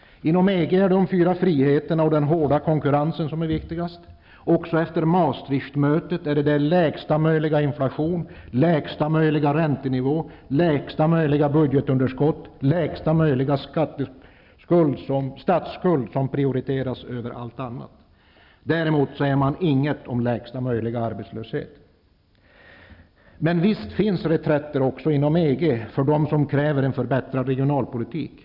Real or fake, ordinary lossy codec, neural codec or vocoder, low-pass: real; none; none; 5.4 kHz